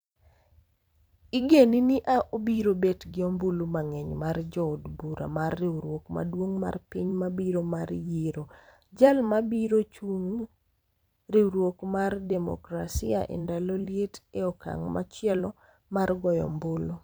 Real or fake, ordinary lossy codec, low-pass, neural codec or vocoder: fake; none; none; vocoder, 44.1 kHz, 128 mel bands every 256 samples, BigVGAN v2